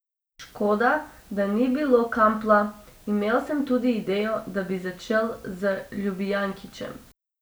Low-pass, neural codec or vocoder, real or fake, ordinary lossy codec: none; none; real; none